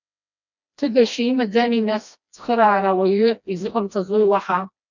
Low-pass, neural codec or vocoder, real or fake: 7.2 kHz; codec, 16 kHz, 1 kbps, FreqCodec, smaller model; fake